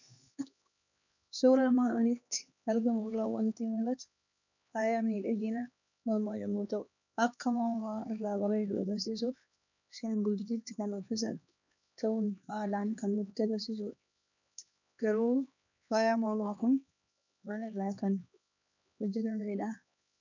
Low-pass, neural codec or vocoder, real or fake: 7.2 kHz; codec, 16 kHz, 2 kbps, X-Codec, HuBERT features, trained on LibriSpeech; fake